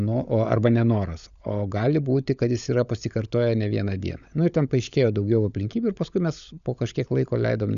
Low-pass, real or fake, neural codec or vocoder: 7.2 kHz; fake; codec, 16 kHz, 16 kbps, FunCodec, trained on LibriTTS, 50 frames a second